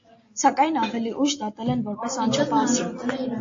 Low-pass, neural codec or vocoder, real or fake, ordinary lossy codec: 7.2 kHz; none; real; AAC, 48 kbps